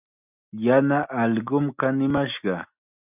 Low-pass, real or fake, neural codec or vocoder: 3.6 kHz; real; none